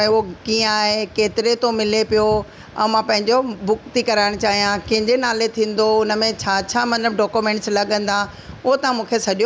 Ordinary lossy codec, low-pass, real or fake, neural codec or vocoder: none; none; real; none